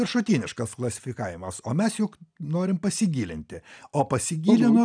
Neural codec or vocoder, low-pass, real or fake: none; 9.9 kHz; real